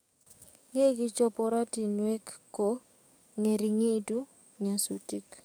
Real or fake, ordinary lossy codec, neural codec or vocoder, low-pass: fake; none; codec, 44.1 kHz, 7.8 kbps, DAC; none